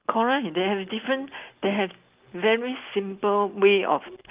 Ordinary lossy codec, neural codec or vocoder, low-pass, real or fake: Opus, 24 kbps; none; 3.6 kHz; real